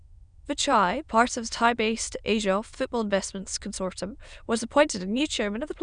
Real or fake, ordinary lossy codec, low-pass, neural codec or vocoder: fake; none; 9.9 kHz; autoencoder, 22.05 kHz, a latent of 192 numbers a frame, VITS, trained on many speakers